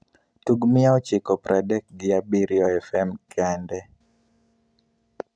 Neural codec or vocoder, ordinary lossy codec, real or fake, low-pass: none; none; real; 9.9 kHz